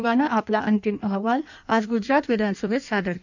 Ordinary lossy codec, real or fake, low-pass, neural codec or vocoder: none; fake; 7.2 kHz; codec, 16 kHz in and 24 kHz out, 1.1 kbps, FireRedTTS-2 codec